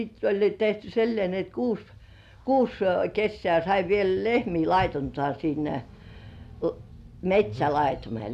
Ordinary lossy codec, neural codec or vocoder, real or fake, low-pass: none; none; real; 14.4 kHz